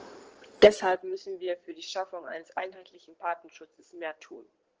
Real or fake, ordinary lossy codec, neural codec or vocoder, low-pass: fake; Opus, 16 kbps; codec, 16 kHz in and 24 kHz out, 2.2 kbps, FireRedTTS-2 codec; 7.2 kHz